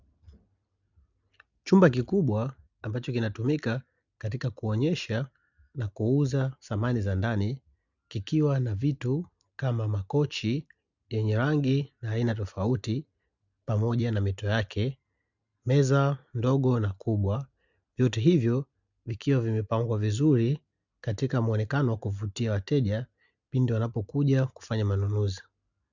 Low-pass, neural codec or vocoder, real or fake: 7.2 kHz; none; real